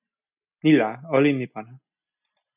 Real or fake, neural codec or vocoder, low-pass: real; none; 3.6 kHz